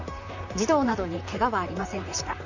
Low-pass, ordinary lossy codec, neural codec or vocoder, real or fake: 7.2 kHz; none; vocoder, 44.1 kHz, 128 mel bands, Pupu-Vocoder; fake